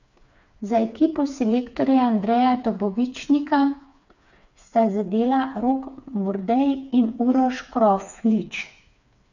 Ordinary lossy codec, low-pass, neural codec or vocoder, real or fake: none; 7.2 kHz; codec, 16 kHz, 4 kbps, FreqCodec, smaller model; fake